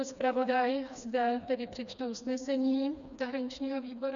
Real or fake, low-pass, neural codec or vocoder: fake; 7.2 kHz; codec, 16 kHz, 2 kbps, FreqCodec, smaller model